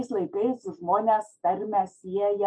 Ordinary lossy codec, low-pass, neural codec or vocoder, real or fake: MP3, 48 kbps; 9.9 kHz; none; real